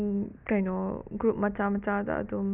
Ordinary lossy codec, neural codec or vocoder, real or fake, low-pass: none; none; real; 3.6 kHz